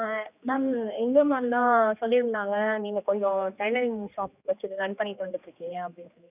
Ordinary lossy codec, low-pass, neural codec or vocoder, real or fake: none; 3.6 kHz; codec, 16 kHz, 2 kbps, X-Codec, HuBERT features, trained on general audio; fake